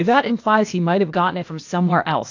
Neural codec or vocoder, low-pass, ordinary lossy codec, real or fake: codec, 16 kHz, 0.8 kbps, ZipCodec; 7.2 kHz; AAC, 48 kbps; fake